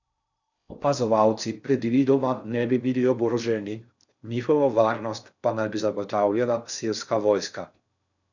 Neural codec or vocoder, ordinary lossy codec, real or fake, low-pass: codec, 16 kHz in and 24 kHz out, 0.6 kbps, FocalCodec, streaming, 4096 codes; none; fake; 7.2 kHz